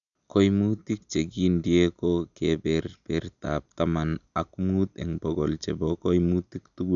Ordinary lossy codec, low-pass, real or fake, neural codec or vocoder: none; 7.2 kHz; real; none